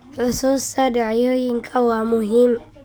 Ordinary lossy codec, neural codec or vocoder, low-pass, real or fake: none; codec, 44.1 kHz, 7.8 kbps, DAC; none; fake